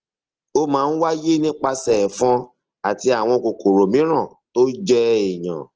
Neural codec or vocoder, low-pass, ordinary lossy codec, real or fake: none; 7.2 kHz; Opus, 16 kbps; real